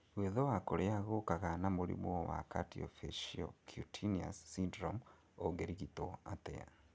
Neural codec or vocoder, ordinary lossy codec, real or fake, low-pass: none; none; real; none